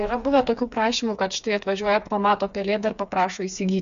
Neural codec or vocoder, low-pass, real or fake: codec, 16 kHz, 4 kbps, FreqCodec, smaller model; 7.2 kHz; fake